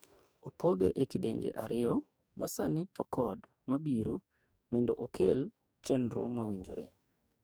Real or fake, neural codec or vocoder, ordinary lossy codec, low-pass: fake; codec, 44.1 kHz, 2.6 kbps, DAC; none; none